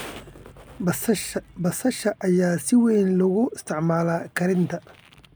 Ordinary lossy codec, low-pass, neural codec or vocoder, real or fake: none; none; none; real